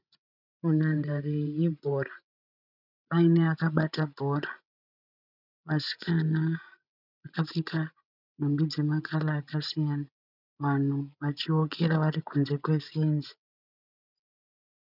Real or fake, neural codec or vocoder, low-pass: fake; codec, 16 kHz, 16 kbps, FreqCodec, larger model; 5.4 kHz